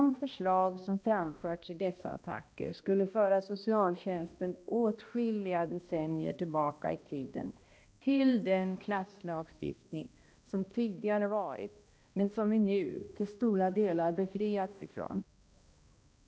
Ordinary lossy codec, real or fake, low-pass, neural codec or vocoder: none; fake; none; codec, 16 kHz, 1 kbps, X-Codec, HuBERT features, trained on balanced general audio